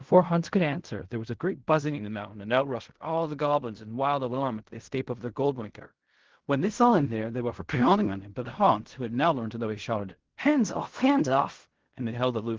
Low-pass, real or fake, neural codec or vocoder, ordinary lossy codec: 7.2 kHz; fake; codec, 16 kHz in and 24 kHz out, 0.4 kbps, LongCat-Audio-Codec, fine tuned four codebook decoder; Opus, 16 kbps